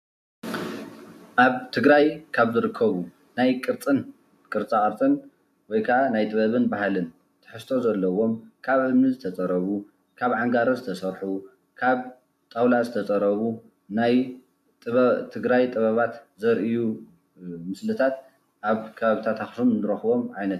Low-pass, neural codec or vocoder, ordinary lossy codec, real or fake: 14.4 kHz; none; AAC, 96 kbps; real